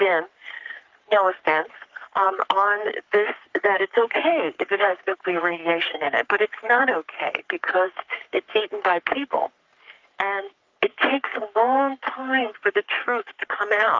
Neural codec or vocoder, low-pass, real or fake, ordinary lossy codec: codec, 44.1 kHz, 3.4 kbps, Pupu-Codec; 7.2 kHz; fake; Opus, 32 kbps